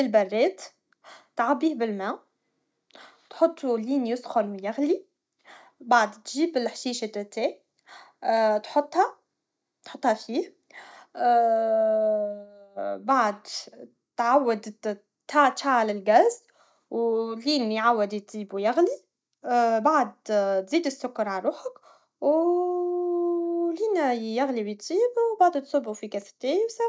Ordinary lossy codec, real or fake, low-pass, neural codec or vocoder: none; real; none; none